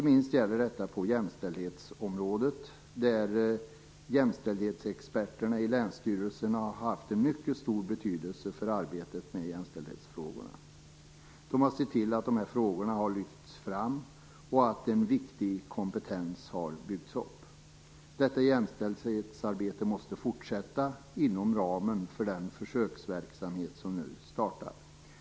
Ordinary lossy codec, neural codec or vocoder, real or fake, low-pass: none; none; real; none